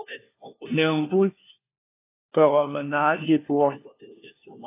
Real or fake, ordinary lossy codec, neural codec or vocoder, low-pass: fake; AAC, 24 kbps; codec, 16 kHz, 1 kbps, FunCodec, trained on LibriTTS, 50 frames a second; 3.6 kHz